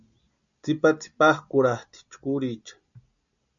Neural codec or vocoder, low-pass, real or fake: none; 7.2 kHz; real